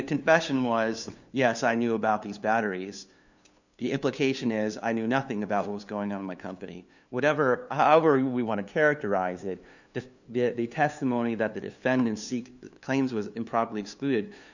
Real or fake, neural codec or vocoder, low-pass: fake; codec, 16 kHz, 2 kbps, FunCodec, trained on LibriTTS, 25 frames a second; 7.2 kHz